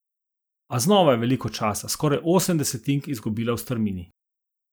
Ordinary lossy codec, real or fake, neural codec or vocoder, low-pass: none; real; none; none